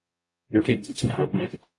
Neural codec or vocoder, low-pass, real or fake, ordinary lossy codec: codec, 44.1 kHz, 0.9 kbps, DAC; 10.8 kHz; fake; AAC, 48 kbps